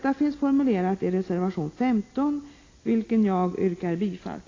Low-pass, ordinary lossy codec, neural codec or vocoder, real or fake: 7.2 kHz; AAC, 32 kbps; none; real